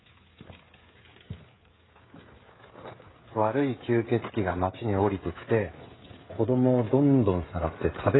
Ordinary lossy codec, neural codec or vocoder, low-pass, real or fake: AAC, 16 kbps; codec, 16 kHz, 16 kbps, FreqCodec, smaller model; 7.2 kHz; fake